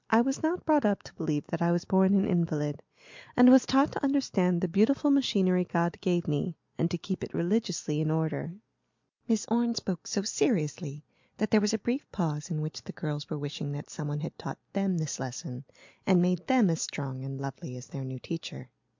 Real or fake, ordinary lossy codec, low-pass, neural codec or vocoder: real; MP3, 64 kbps; 7.2 kHz; none